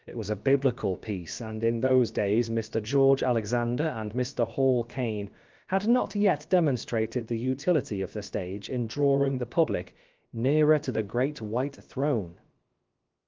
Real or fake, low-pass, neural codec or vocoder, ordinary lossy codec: fake; 7.2 kHz; codec, 16 kHz, about 1 kbps, DyCAST, with the encoder's durations; Opus, 24 kbps